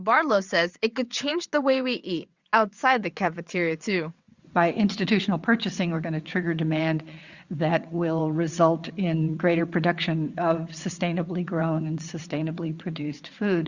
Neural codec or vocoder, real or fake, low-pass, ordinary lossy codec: vocoder, 22.05 kHz, 80 mel bands, WaveNeXt; fake; 7.2 kHz; Opus, 64 kbps